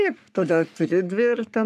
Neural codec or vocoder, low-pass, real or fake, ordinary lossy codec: codec, 44.1 kHz, 3.4 kbps, Pupu-Codec; 14.4 kHz; fake; MP3, 96 kbps